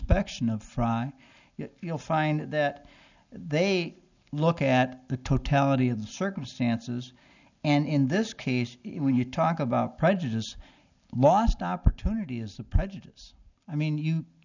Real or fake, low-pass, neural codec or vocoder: real; 7.2 kHz; none